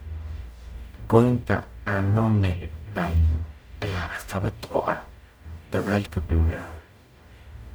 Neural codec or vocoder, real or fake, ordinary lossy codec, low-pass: codec, 44.1 kHz, 0.9 kbps, DAC; fake; none; none